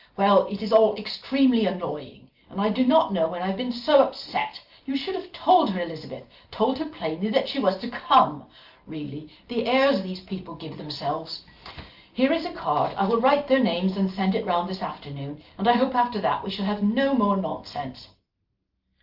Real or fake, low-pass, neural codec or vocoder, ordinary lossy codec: real; 5.4 kHz; none; Opus, 32 kbps